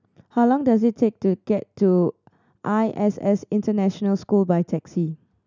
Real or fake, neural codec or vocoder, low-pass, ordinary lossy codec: real; none; 7.2 kHz; none